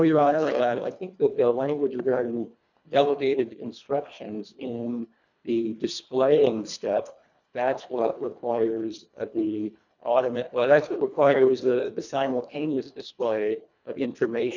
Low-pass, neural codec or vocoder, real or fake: 7.2 kHz; codec, 24 kHz, 1.5 kbps, HILCodec; fake